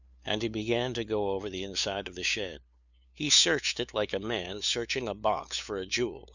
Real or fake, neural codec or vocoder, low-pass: fake; codec, 16 kHz, 2 kbps, FunCodec, trained on LibriTTS, 25 frames a second; 7.2 kHz